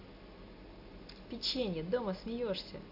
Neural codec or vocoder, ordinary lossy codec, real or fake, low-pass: none; AAC, 48 kbps; real; 5.4 kHz